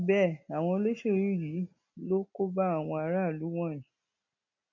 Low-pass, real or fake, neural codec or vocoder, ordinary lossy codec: 7.2 kHz; real; none; none